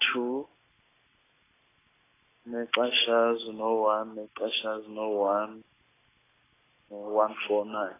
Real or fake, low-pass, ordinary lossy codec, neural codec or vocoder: real; 3.6 kHz; AAC, 16 kbps; none